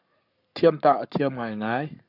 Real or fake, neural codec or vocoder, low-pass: fake; codec, 44.1 kHz, 7.8 kbps, Pupu-Codec; 5.4 kHz